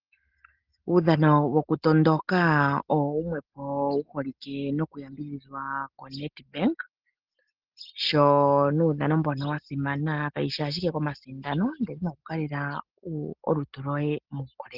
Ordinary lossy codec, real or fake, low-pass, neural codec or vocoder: Opus, 16 kbps; real; 5.4 kHz; none